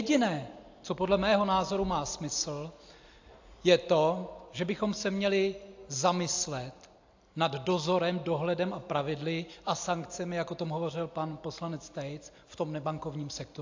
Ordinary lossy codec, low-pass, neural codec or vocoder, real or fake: AAC, 48 kbps; 7.2 kHz; none; real